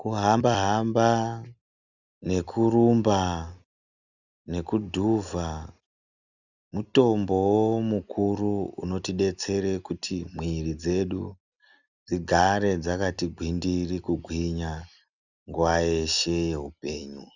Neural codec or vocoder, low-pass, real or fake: none; 7.2 kHz; real